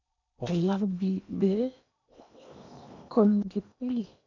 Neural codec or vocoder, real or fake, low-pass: codec, 16 kHz in and 24 kHz out, 0.8 kbps, FocalCodec, streaming, 65536 codes; fake; 7.2 kHz